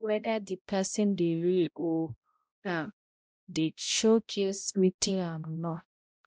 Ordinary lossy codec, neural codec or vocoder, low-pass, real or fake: none; codec, 16 kHz, 0.5 kbps, X-Codec, HuBERT features, trained on balanced general audio; none; fake